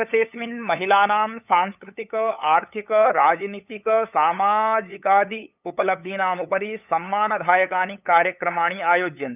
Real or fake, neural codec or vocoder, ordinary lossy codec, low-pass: fake; codec, 16 kHz, 8 kbps, FunCodec, trained on LibriTTS, 25 frames a second; none; 3.6 kHz